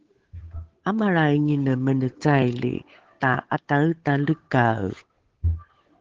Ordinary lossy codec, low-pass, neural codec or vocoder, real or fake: Opus, 16 kbps; 7.2 kHz; codec, 16 kHz, 8 kbps, FunCodec, trained on Chinese and English, 25 frames a second; fake